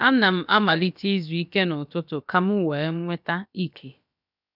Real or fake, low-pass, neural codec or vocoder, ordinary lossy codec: fake; 5.4 kHz; codec, 16 kHz, about 1 kbps, DyCAST, with the encoder's durations; none